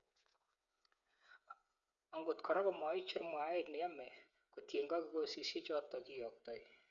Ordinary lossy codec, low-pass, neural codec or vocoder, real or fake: none; 7.2 kHz; codec, 16 kHz, 8 kbps, FreqCodec, smaller model; fake